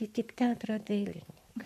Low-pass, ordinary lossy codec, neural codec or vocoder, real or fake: 14.4 kHz; MP3, 96 kbps; codec, 44.1 kHz, 7.8 kbps, Pupu-Codec; fake